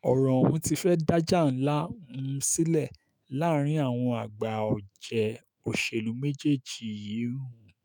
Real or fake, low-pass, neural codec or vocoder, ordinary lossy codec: fake; none; autoencoder, 48 kHz, 128 numbers a frame, DAC-VAE, trained on Japanese speech; none